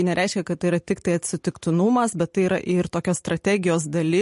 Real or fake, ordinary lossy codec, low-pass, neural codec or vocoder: real; MP3, 48 kbps; 14.4 kHz; none